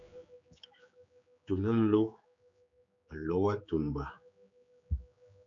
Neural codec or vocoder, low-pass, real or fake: codec, 16 kHz, 4 kbps, X-Codec, HuBERT features, trained on general audio; 7.2 kHz; fake